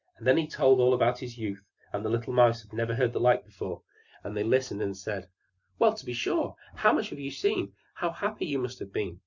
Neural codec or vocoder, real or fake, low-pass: none; real; 7.2 kHz